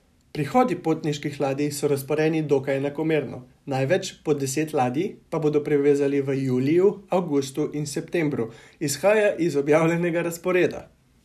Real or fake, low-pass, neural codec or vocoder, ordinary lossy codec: real; 14.4 kHz; none; none